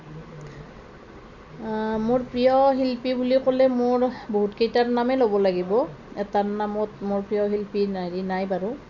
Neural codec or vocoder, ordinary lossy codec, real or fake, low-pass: none; none; real; 7.2 kHz